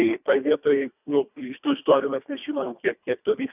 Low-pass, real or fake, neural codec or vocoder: 3.6 kHz; fake; codec, 24 kHz, 1.5 kbps, HILCodec